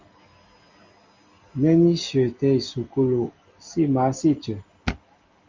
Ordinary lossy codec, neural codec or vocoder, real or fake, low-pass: Opus, 32 kbps; none; real; 7.2 kHz